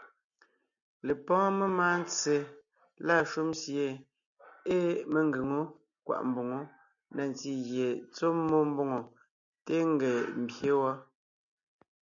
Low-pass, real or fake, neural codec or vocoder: 7.2 kHz; real; none